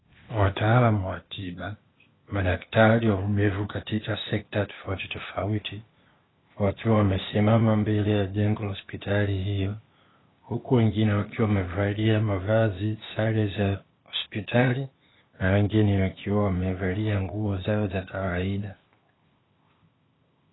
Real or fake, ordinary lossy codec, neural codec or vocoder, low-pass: fake; AAC, 16 kbps; codec, 16 kHz, 0.8 kbps, ZipCodec; 7.2 kHz